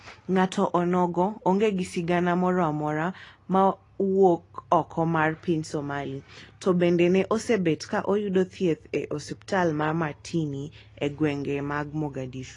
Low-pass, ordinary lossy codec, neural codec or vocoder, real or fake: 10.8 kHz; AAC, 32 kbps; none; real